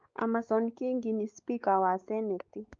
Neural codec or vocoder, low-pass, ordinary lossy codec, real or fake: codec, 16 kHz, 4 kbps, X-Codec, WavLM features, trained on Multilingual LibriSpeech; 7.2 kHz; Opus, 24 kbps; fake